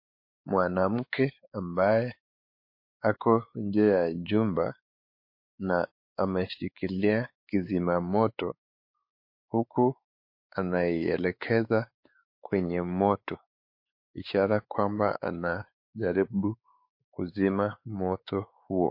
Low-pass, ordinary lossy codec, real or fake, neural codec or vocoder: 5.4 kHz; MP3, 32 kbps; fake; codec, 16 kHz, 4 kbps, X-Codec, WavLM features, trained on Multilingual LibriSpeech